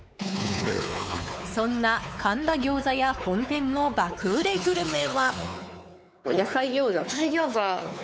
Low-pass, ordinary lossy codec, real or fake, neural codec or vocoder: none; none; fake; codec, 16 kHz, 4 kbps, X-Codec, WavLM features, trained on Multilingual LibriSpeech